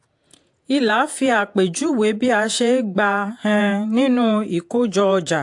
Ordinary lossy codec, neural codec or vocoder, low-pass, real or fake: AAC, 64 kbps; vocoder, 48 kHz, 128 mel bands, Vocos; 10.8 kHz; fake